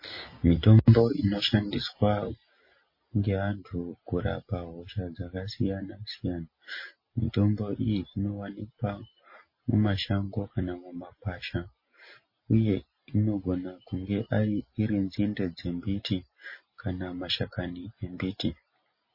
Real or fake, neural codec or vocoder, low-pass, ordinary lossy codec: real; none; 5.4 kHz; MP3, 24 kbps